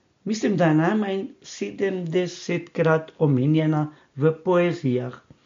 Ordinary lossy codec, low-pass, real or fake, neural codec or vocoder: MP3, 48 kbps; 7.2 kHz; real; none